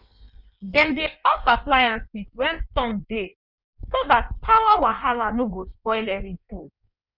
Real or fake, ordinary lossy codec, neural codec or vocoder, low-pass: fake; none; codec, 16 kHz in and 24 kHz out, 1.1 kbps, FireRedTTS-2 codec; 5.4 kHz